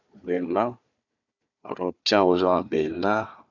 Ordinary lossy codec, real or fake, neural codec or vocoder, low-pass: none; fake; codec, 16 kHz, 4 kbps, FunCodec, trained on Chinese and English, 50 frames a second; 7.2 kHz